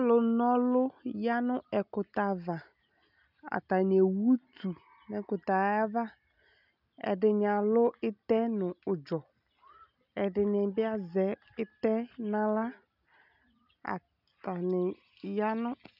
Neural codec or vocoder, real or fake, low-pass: none; real; 5.4 kHz